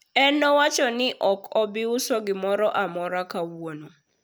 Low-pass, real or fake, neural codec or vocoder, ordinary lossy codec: none; real; none; none